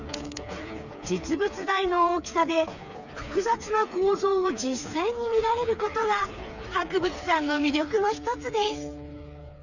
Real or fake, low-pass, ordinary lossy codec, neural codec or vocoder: fake; 7.2 kHz; none; codec, 16 kHz, 4 kbps, FreqCodec, smaller model